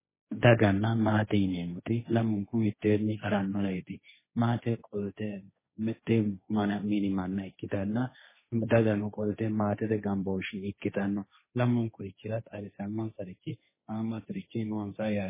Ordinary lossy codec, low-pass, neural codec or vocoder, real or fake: MP3, 16 kbps; 3.6 kHz; codec, 16 kHz, 1.1 kbps, Voila-Tokenizer; fake